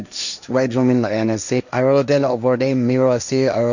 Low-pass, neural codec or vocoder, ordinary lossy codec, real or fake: 7.2 kHz; codec, 16 kHz, 1.1 kbps, Voila-Tokenizer; none; fake